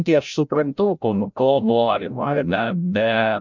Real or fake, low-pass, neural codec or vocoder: fake; 7.2 kHz; codec, 16 kHz, 0.5 kbps, FreqCodec, larger model